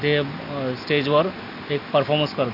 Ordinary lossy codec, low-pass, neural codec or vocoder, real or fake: none; 5.4 kHz; none; real